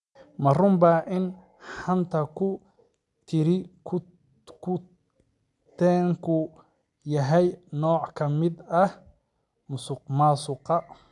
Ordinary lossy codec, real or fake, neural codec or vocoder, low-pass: none; real; none; 10.8 kHz